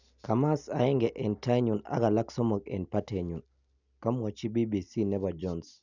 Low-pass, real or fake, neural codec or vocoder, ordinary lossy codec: 7.2 kHz; real; none; none